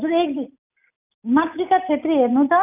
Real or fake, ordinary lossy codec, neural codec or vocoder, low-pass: real; none; none; 3.6 kHz